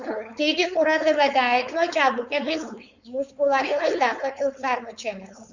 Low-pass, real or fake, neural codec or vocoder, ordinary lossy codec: 7.2 kHz; fake; codec, 16 kHz, 4.8 kbps, FACodec; Opus, 64 kbps